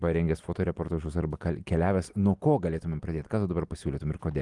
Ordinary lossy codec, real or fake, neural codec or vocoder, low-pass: Opus, 24 kbps; real; none; 10.8 kHz